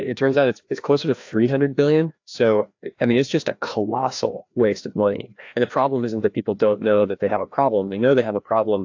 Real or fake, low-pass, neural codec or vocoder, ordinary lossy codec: fake; 7.2 kHz; codec, 16 kHz, 1 kbps, FreqCodec, larger model; AAC, 48 kbps